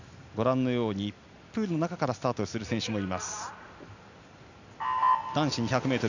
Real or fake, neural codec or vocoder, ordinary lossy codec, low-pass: real; none; none; 7.2 kHz